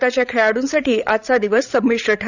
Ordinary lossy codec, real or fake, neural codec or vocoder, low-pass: none; fake; codec, 16 kHz, 16 kbps, FreqCodec, larger model; 7.2 kHz